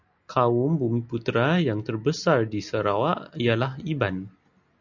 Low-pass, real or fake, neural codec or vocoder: 7.2 kHz; real; none